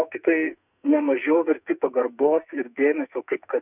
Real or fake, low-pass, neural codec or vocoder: fake; 3.6 kHz; codec, 44.1 kHz, 2.6 kbps, SNAC